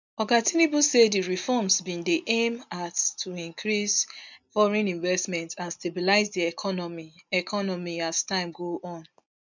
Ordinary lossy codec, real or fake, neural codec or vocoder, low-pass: none; real; none; 7.2 kHz